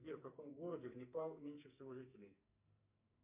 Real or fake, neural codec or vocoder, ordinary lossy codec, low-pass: fake; codec, 44.1 kHz, 2.6 kbps, SNAC; MP3, 32 kbps; 3.6 kHz